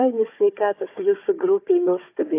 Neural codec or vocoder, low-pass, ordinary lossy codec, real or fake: codec, 16 kHz, 4 kbps, FunCodec, trained on Chinese and English, 50 frames a second; 3.6 kHz; AAC, 24 kbps; fake